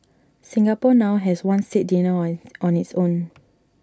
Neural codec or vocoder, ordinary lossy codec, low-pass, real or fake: none; none; none; real